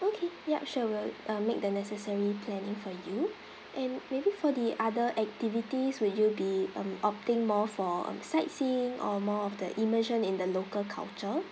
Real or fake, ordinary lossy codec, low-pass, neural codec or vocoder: real; none; none; none